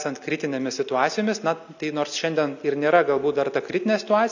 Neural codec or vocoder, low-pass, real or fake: none; 7.2 kHz; real